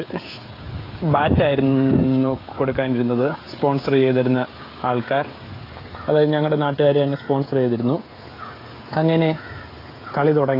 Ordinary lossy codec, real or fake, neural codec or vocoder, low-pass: AAC, 24 kbps; fake; codec, 16 kHz, 16 kbps, FreqCodec, smaller model; 5.4 kHz